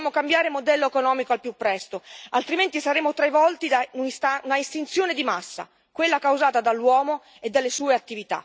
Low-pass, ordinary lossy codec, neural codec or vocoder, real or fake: none; none; none; real